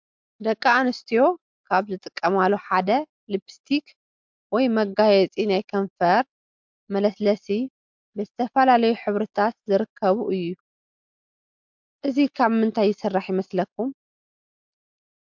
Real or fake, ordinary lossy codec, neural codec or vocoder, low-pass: real; MP3, 64 kbps; none; 7.2 kHz